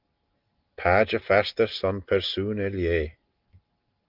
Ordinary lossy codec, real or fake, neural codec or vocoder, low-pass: Opus, 32 kbps; real; none; 5.4 kHz